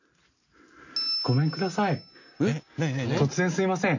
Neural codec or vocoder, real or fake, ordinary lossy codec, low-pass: none; real; none; 7.2 kHz